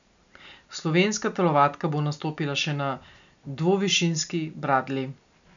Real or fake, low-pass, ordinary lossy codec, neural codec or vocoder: real; 7.2 kHz; MP3, 96 kbps; none